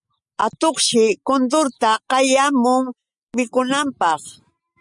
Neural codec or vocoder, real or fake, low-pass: none; real; 10.8 kHz